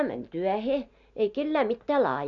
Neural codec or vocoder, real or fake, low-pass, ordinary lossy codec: none; real; 7.2 kHz; none